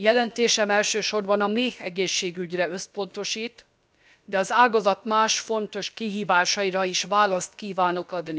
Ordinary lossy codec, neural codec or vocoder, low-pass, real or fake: none; codec, 16 kHz, about 1 kbps, DyCAST, with the encoder's durations; none; fake